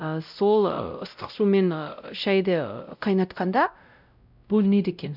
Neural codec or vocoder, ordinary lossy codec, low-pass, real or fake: codec, 16 kHz, 0.5 kbps, X-Codec, WavLM features, trained on Multilingual LibriSpeech; none; 5.4 kHz; fake